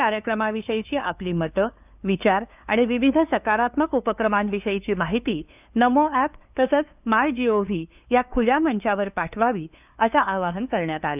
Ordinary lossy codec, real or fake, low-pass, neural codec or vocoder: none; fake; 3.6 kHz; codec, 16 kHz, 2 kbps, FunCodec, trained on LibriTTS, 25 frames a second